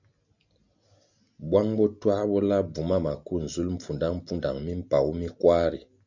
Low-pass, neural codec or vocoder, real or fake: 7.2 kHz; none; real